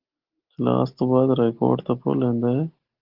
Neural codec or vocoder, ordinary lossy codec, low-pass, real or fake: none; Opus, 16 kbps; 5.4 kHz; real